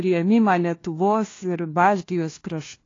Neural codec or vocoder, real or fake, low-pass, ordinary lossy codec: codec, 16 kHz, 1 kbps, FunCodec, trained on LibriTTS, 50 frames a second; fake; 7.2 kHz; AAC, 32 kbps